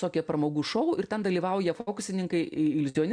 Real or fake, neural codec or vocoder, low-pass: real; none; 9.9 kHz